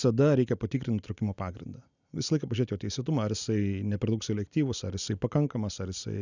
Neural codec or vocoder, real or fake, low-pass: none; real; 7.2 kHz